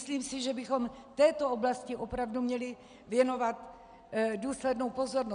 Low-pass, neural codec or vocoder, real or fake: 9.9 kHz; none; real